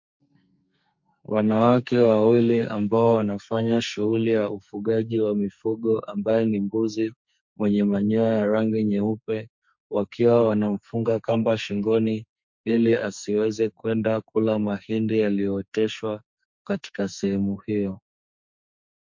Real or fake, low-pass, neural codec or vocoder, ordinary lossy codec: fake; 7.2 kHz; codec, 44.1 kHz, 2.6 kbps, SNAC; MP3, 48 kbps